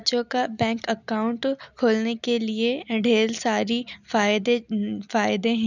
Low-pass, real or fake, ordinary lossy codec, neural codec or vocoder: 7.2 kHz; real; none; none